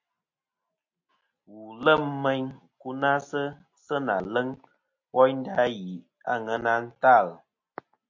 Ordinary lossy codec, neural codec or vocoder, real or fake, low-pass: MP3, 48 kbps; none; real; 7.2 kHz